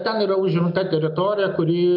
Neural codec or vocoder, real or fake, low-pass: none; real; 5.4 kHz